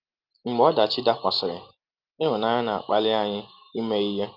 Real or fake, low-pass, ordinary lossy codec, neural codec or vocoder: real; 5.4 kHz; Opus, 24 kbps; none